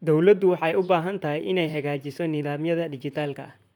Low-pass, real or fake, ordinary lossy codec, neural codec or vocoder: 19.8 kHz; fake; none; vocoder, 44.1 kHz, 128 mel bands, Pupu-Vocoder